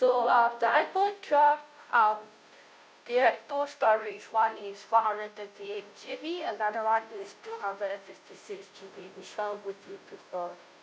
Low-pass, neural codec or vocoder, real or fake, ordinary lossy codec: none; codec, 16 kHz, 0.5 kbps, FunCodec, trained on Chinese and English, 25 frames a second; fake; none